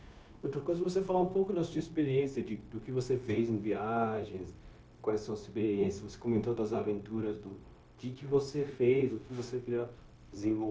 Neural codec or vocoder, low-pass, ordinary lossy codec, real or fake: codec, 16 kHz, 0.9 kbps, LongCat-Audio-Codec; none; none; fake